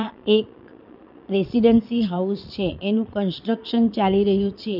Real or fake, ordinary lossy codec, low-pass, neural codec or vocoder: fake; none; 5.4 kHz; vocoder, 22.05 kHz, 80 mel bands, Vocos